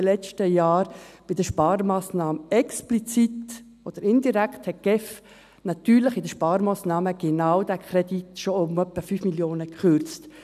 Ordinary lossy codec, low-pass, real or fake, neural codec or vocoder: none; 14.4 kHz; real; none